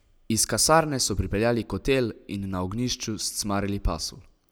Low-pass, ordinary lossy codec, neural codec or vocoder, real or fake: none; none; none; real